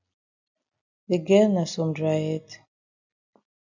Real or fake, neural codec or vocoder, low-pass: real; none; 7.2 kHz